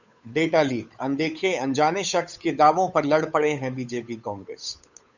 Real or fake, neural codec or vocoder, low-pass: fake; codec, 16 kHz, 8 kbps, FunCodec, trained on Chinese and English, 25 frames a second; 7.2 kHz